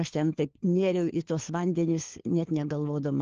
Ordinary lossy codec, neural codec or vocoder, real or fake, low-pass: Opus, 16 kbps; codec, 16 kHz, 4 kbps, FunCodec, trained on LibriTTS, 50 frames a second; fake; 7.2 kHz